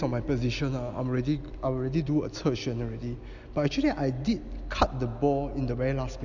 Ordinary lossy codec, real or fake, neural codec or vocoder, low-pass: none; real; none; 7.2 kHz